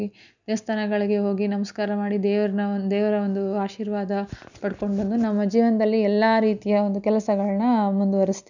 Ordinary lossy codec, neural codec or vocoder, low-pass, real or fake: none; none; 7.2 kHz; real